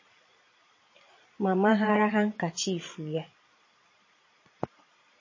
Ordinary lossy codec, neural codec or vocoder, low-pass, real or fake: MP3, 32 kbps; vocoder, 22.05 kHz, 80 mel bands, Vocos; 7.2 kHz; fake